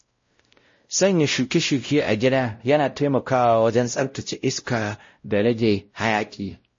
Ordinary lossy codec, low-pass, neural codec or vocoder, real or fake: MP3, 32 kbps; 7.2 kHz; codec, 16 kHz, 0.5 kbps, X-Codec, WavLM features, trained on Multilingual LibriSpeech; fake